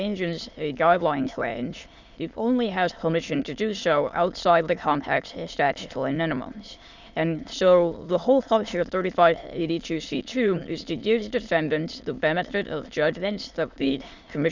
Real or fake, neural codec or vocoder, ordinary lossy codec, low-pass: fake; autoencoder, 22.05 kHz, a latent of 192 numbers a frame, VITS, trained on many speakers; Opus, 64 kbps; 7.2 kHz